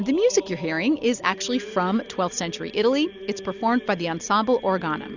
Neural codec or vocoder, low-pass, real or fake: none; 7.2 kHz; real